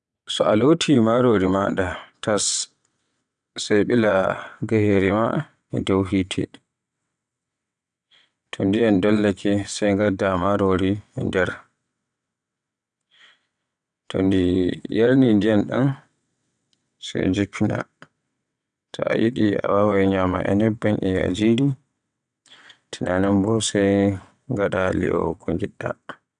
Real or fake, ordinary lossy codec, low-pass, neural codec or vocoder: fake; none; 9.9 kHz; vocoder, 22.05 kHz, 80 mel bands, WaveNeXt